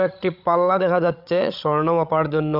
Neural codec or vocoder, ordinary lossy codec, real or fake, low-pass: codec, 16 kHz, 16 kbps, FreqCodec, larger model; none; fake; 5.4 kHz